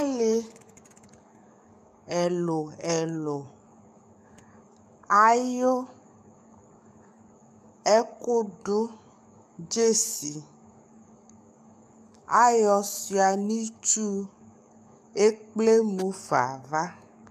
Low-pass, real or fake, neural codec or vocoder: 14.4 kHz; fake; codec, 44.1 kHz, 7.8 kbps, DAC